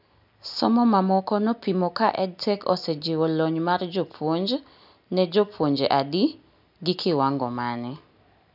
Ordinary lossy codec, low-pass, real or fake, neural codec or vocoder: none; 5.4 kHz; real; none